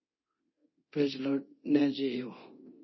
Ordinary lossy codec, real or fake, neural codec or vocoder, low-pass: MP3, 24 kbps; fake; codec, 24 kHz, 0.9 kbps, DualCodec; 7.2 kHz